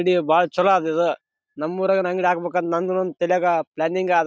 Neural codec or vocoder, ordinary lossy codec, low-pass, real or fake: none; none; none; real